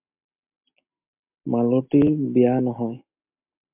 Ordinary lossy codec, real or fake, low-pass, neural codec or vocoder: MP3, 32 kbps; real; 3.6 kHz; none